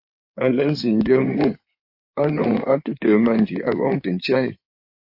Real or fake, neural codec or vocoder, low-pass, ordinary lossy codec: fake; codec, 16 kHz in and 24 kHz out, 2.2 kbps, FireRedTTS-2 codec; 5.4 kHz; MP3, 32 kbps